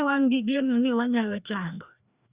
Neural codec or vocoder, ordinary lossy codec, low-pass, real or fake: codec, 16 kHz, 1 kbps, FreqCodec, larger model; Opus, 64 kbps; 3.6 kHz; fake